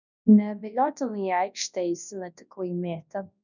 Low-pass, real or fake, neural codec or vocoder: 7.2 kHz; fake; codec, 24 kHz, 0.9 kbps, WavTokenizer, large speech release